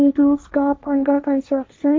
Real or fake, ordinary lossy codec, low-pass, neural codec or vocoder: fake; none; none; codec, 16 kHz, 1.1 kbps, Voila-Tokenizer